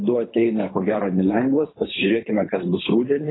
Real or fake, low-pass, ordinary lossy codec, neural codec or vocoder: fake; 7.2 kHz; AAC, 16 kbps; codec, 24 kHz, 3 kbps, HILCodec